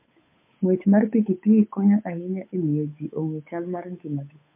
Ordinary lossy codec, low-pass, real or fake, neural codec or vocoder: MP3, 24 kbps; 3.6 kHz; fake; codec, 16 kHz, 8 kbps, FunCodec, trained on Chinese and English, 25 frames a second